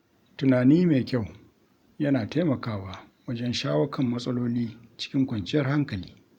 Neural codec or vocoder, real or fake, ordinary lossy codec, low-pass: vocoder, 44.1 kHz, 128 mel bands every 512 samples, BigVGAN v2; fake; none; 19.8 kHz